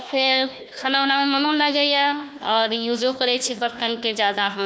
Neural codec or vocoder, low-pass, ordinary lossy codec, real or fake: codec, 16 kHz, 1 kbps, FunCodec, trained on Chinese and English, 50 frames a second; none; none; fake